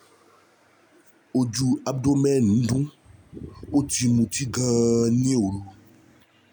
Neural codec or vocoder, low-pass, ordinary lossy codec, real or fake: none; none; none; real